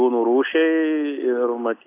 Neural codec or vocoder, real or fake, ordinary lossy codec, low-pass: none; real; AAC, 24 kbps; 3.6 kHz